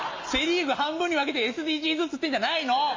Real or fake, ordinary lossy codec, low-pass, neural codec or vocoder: real; AAC, 48 kbps; 7.2 kHz; none